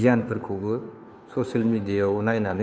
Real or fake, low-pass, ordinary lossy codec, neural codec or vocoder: fake; none; none; codec, 16 kHz, 2 kbps, FunCodec, trained on Chinese and English, 25 frames a second